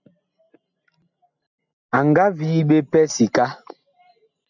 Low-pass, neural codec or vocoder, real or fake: 7.2 kHz; none; real